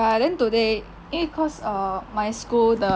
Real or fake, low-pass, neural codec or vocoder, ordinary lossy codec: real; none; none; none